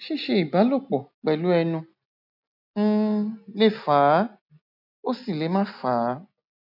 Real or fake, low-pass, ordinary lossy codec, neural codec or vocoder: real; 5.4 kHz; none; none